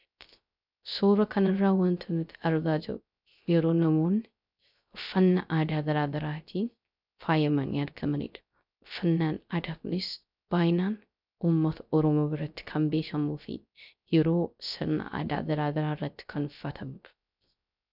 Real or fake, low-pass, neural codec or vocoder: fake; 5.4 kHz; codec, 16 kHz, 0.3 kbps, FocalCodec